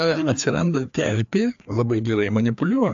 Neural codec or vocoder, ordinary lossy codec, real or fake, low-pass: codec, 16 kHz, 2 kbps, FreqCodec, larger model; AAC, 64 kbps; fake; 7.2 kHz